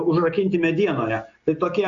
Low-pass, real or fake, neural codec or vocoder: 7.2 kHz; real; none